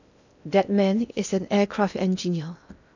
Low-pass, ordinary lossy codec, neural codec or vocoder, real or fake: 7.2 kHz; none; codec, 16 kHz in and 24 kHz out, 0.8 kbps, FocalCodec, streaming, 65536 codes; fake